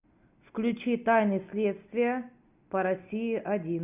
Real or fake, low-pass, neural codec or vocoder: real; 3.6 kHz; none